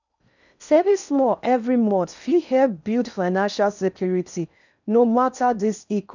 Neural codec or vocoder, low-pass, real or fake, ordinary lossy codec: codec, 16 kHz in and 24 kHz out, 0.6 kbps, FocalCodec, streaming, 4096 codes; 7.2 kHz; fake; none